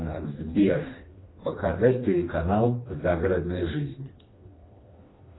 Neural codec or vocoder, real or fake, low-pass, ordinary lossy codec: codec, 16 kHz, 2 kbps, FreqCodec, smaller model; fake; 7.2 kHz; AAC, 16 kbps